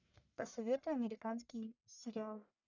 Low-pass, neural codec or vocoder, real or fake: 7.2 kHz; codec, 44.1 kHz, 1.7 kbps, Pupu-Codec; fake